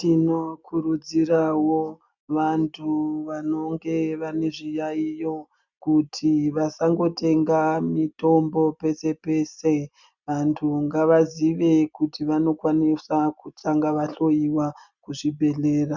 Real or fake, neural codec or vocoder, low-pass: real; none; 7.2 kHz